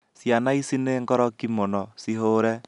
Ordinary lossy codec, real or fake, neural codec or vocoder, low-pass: none; real; none; 10.8 kHz